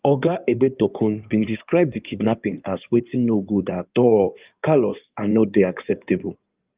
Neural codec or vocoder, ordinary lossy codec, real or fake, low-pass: codec, 16 kHz in and 24 kHz out, 2.2 kbps, FireRedTTS-2 codec; Opus, 24 kbps; fake; 3.6 kHz